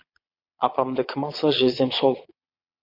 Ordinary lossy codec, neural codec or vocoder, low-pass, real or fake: MP3, 32 kbps; vocoder, 22.05 kHz, 80 mel bands, Vocos; 5.4 kHz; fake